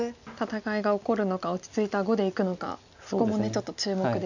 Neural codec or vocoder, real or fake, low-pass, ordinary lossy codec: none; real; 7.2 kHz; none